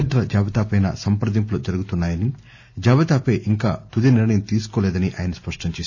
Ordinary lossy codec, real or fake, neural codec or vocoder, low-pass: none; real; none; 7.2 kHz